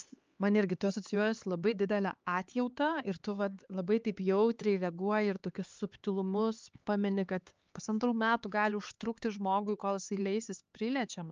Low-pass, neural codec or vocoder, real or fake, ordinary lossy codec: 7.2 kHz; codec, 16 kHz, 4 kbps, X-Codec, HuBERT features, trained on LibriSpeech; fake; Opus, 24 kbps